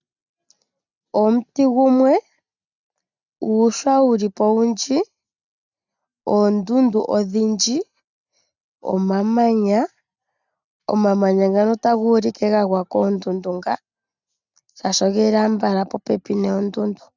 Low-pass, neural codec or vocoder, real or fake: 7.2 kHz; none; real